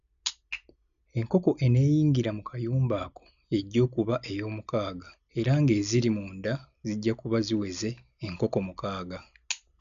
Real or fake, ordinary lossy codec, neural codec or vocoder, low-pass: real; none; none; 7.2 kHz